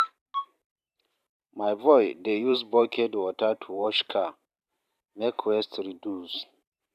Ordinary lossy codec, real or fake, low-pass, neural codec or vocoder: none; fake; 14.4 kHz; vocoder, 44.1 kHz, 128 mel bands every 512 samples, BigVGAN v2